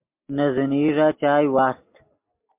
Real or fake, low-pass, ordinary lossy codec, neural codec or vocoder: real; 3.6 kHz; MP3, 32 kbps; none